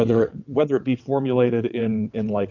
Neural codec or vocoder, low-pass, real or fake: vocoder, 22.05 kHz, 80 mel bands, WaveNeXt; 7.2 kHz; fake